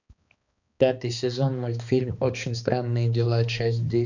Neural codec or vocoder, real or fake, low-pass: codec, 16 kHz, 2 kbps, X-Codec, HuBERT features, trained on balanced general audio; fake; 7.2 kHz